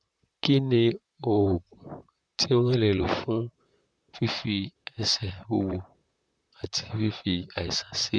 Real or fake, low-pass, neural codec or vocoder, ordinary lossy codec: fake; 9.9 kHz; vocoder, 44.1 kHz, 128 mel bands, Pupu-Vocoder; none